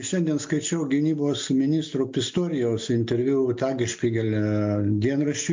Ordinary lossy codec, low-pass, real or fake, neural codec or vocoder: MP3, 48 kbps; 7.2 kHz; real; none